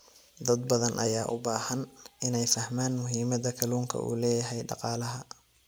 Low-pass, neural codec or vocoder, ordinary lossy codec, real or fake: none; none; none; real